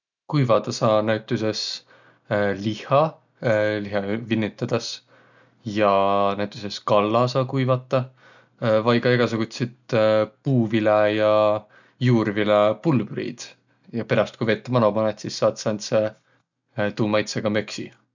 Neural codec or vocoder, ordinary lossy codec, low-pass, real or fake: none; none; 7.2 kHz; real